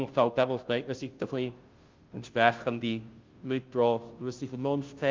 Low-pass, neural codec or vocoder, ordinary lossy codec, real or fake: 7.2 kHz; codec, 16 kHz, 0.5 kbps, FunCodec, trained on Chinese and English, 25 frames a second; Opus, 24 kbps; fake